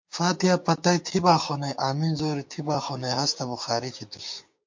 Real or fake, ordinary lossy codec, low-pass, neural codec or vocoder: fake; MP3, 48 kbps; 7.2 kHz; codec, 16 kHz, 6 kbps, DAC